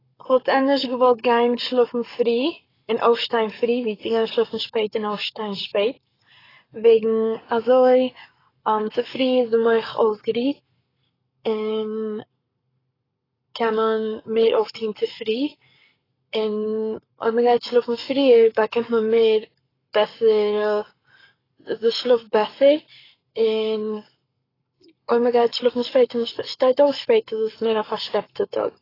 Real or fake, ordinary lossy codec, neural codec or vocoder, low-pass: fake; AAC, 24 kbps; codec, 44.1 kHz, 7.8 kbps, Pupu-Codec; 5.4 kHz